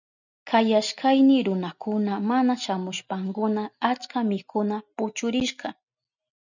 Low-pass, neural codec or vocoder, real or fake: 7.2 kHz; none; real